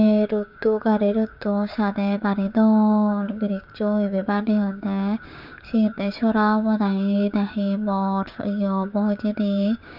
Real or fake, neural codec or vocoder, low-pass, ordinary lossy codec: fake; codec, 16 kHz, 6 kbps, DAC; 5.4 kHz; none